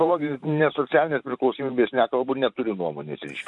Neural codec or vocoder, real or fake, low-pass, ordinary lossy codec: vocoder, 44.1 kHz, 128 mel bands every 512 samples, BigVGAN v2; fake; 10.8 kHz; MP3, 96 kbps